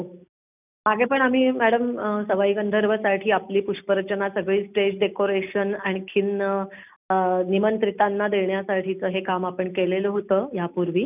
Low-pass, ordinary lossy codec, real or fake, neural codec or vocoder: 3.6 kHz; none; real; none